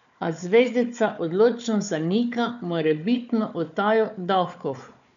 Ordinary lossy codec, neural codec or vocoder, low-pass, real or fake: none; codec, 16 kHz, 4 kbps, FunCodec, trained on Chinese and English, 50 frames a second; 7.2 kHz; fake